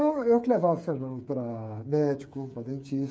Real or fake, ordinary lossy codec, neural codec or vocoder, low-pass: fake; none; codec, 16 kHz, 8 kbps, FreqCodec, smaller model; none